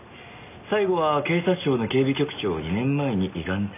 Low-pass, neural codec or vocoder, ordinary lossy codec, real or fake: 3.6 kHz; none; none; real